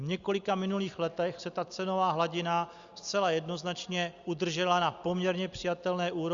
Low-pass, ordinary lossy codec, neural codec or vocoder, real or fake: 7.2 kHz; Opus, 64 kbps; none; real